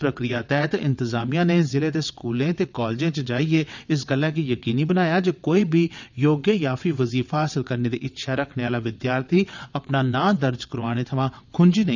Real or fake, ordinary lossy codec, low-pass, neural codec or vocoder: fake; none; 7.2 kHz; vocoder, 22.05 kHz, 80 mel bands, WaveNeXt